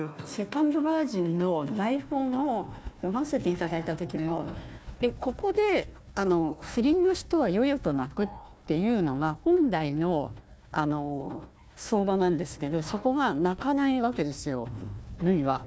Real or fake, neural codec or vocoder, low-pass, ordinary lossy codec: fake; codec, 16 kHz, 1 kbps, FunCodec, trained on Chinese and English, 50 frames a second; none; none